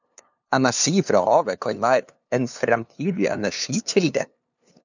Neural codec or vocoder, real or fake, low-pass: codec, 16 kHz, 2 kbps, FunCodec, trained on LibriTTS, 25 frames a second; fake; 7.2 kHz